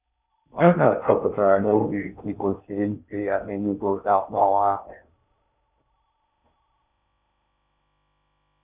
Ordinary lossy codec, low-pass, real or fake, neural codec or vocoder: none; 3.6 kHz; fake; codec, 16 kHz in and 24 kHz out, 0.6 kbps, FocalCodec, streaming, 4096 codes